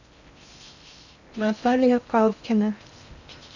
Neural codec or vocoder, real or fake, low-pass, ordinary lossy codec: codec, 16 kHz in and 24 kHz out, 0.6 kbps, FocalCodec, streaming, 2048 codes; fake; 7.2 kHz; none